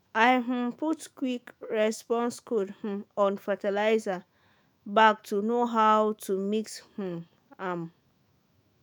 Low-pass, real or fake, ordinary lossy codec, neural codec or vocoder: none; fake; none; autoencoder, 48 kHz, 128 numbers a frame, DAC-VAE, trained on Japanese speech